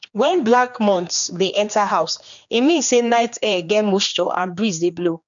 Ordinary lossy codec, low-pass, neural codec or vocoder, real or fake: MP3, 64 kbps; 7.2 kHz; codec, 16 kHz, 2 kbps, X-Codec, HuBERT features, trained on general audio; fake